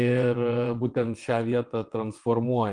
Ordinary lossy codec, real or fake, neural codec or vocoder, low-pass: Opus, 24 kbps; fake; vocoder, 22.05 kHz, 80 mel bands, WaveNeXt; 9.9 kHz